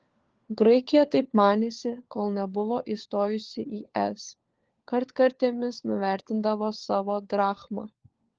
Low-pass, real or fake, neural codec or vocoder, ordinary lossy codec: 7.2 kHz; fake; codec, 16 kHz, 4 kbps, FunCodec, trained on LibriTTS, 50 frames a second; Opus, 16 kbps